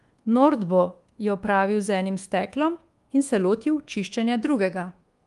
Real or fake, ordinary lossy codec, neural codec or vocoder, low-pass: fake; Opus, 24 kbps; codec, 24 kHz, 1.2 kbps, DualCodec; 10.8 kHz